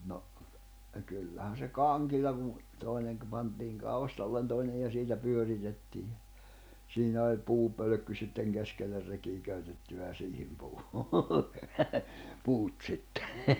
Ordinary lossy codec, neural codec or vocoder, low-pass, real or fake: none; none; none; real